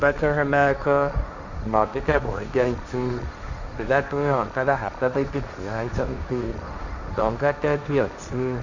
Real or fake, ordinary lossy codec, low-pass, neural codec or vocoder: fake; none; 7.2 kHz; codec, 16 kHz, 1.1 kbps, Voila-Tokenizer